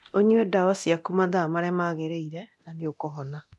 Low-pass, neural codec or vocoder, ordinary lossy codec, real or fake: none; codec, 24 kHz, 0.9 kbps, DualCodec; none; fake